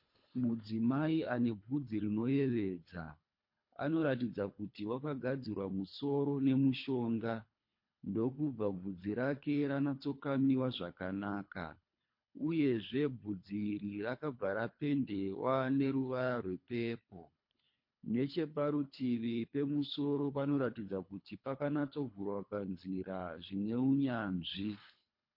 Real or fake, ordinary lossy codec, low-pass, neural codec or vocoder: fake; MP3, 32 kbps; 5.4 kHz; codec, 24 kHz, 3 kbps, HILCodec